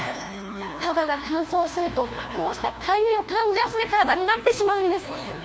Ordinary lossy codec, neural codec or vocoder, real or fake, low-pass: none; codec, 16 kHz, 1 kbps, FunCodec, trained on LibriTTS, 50 frames a second; fake; none